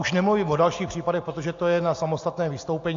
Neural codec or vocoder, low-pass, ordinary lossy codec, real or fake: none; 7.2 kHz; AAC, 48 kbps; real